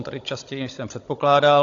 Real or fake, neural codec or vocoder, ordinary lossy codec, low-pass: fake; codec, 16 kHz, 16 kbps, FunCodec, trained on Chinese and English, 50 frames a second; AAC, 64 kbps; 7.2 kHz